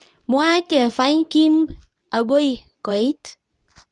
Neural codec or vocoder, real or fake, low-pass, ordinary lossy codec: codec, 24 kHz, 0.9 kbps, WavTokenizer, medium speech release version 2; fake; 10.8 kHz; none